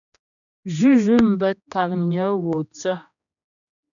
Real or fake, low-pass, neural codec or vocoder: fake; 7.2 kHz; codec, 16 kHz, 1 kbps, X-Codec, HuBERT features, trained on general audio